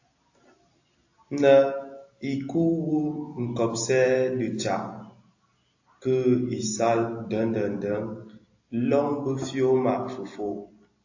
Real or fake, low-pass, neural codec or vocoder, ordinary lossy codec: real; 7.2 kHz; none; AAC, 48 kbps